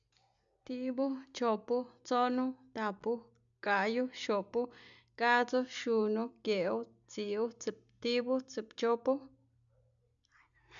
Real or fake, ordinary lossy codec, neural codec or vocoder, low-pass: real; none; none; 7.2 kHz